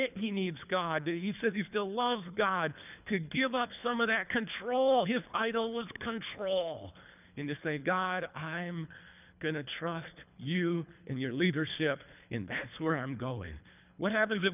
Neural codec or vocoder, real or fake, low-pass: codec, 24 kHz, 3 kbps, HILCodec; fake; 3.6 kHz